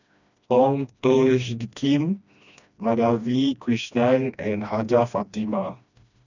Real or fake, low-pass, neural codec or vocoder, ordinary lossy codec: fake; 7.2 kHz; codec, 16 kHz, 1 kbps, FreqCodec, smaller model; none